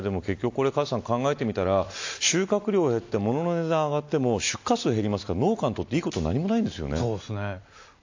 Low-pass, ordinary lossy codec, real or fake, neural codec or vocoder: 7.2 kHz; AAC, 48 kbps; real; none